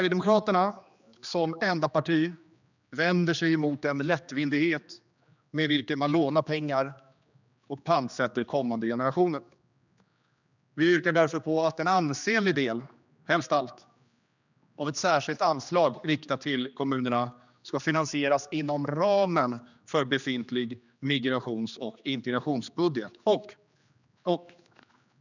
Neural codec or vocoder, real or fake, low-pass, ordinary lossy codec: codec, 16 kHz, 2 kbps, X-Codec, HuBERT features, trained on general audio; fake; 7.2 kHz; none